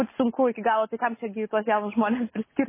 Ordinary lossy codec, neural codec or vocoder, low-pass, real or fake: MP3, 16 kbps; none; 3.6 kHz; real